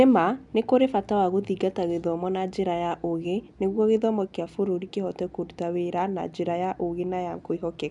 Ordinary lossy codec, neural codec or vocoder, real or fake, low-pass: none; none; real; 10.8 kHz